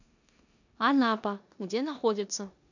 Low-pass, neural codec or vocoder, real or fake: 7.2 kHz; codec, 16 kHz in and 24 kHz out, 0.9 kbps, LongCat-Audio-Codec, four codebook decoder; fake